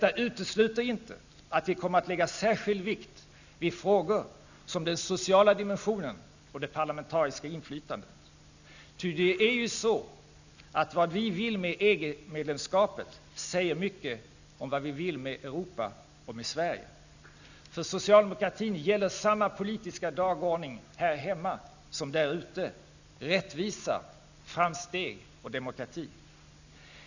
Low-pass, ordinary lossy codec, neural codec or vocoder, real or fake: 7.2 kHz; none; none; real